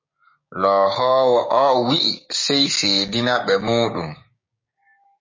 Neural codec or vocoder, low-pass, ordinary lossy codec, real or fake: codec, 16 kHz, 6 kbps, DAC; 7.2 kHz; MP3, 32 kbps; fake